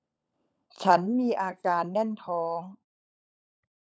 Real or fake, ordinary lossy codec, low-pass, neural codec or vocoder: fake; none; none; codec, 16 kHz, 16 kbps, FunCodec, trained on LibriTTS, 50 frames a second